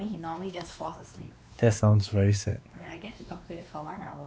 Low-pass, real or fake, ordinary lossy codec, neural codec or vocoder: none; fake; none; codec, 16 kHz, 2 kbps, X-Codec, WavLM features, trained on Multilingual LibriSpeech